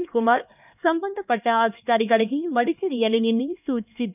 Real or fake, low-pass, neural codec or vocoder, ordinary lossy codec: fake; 3.6 kHz; codec, 16 kHz, 2 kbps, X-Codec, HuBERT features, trained on LibriSpeech; none